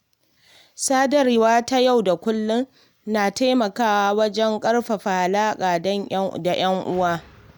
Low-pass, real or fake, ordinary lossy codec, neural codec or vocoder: none; real; none; none